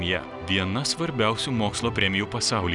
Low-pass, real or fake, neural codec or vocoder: 10.8 kHz; real; none